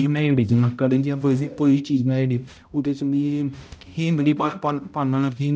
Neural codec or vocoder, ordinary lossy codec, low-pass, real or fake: codec, 16 kHz, 0.5 kbps, X-Codec, HuBERT features, trained on balanced general audio; none; none; fake